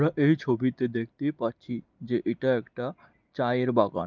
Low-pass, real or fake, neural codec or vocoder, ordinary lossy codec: 7.2 kHz; real; none; Opus, 24 kbps